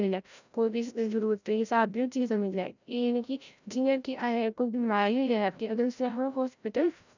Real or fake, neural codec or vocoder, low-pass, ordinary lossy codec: fake; codec, 16 kHz, 0.5 kbps, FreqCodec, larger model; 7.2 kHz; none